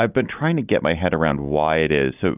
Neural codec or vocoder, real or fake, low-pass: none; real; 3.6 kHz